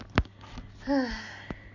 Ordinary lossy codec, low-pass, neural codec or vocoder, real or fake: none; 7.2 kHz; none; real